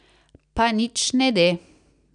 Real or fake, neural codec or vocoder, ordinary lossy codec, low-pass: real; none; none; 9.9 kHz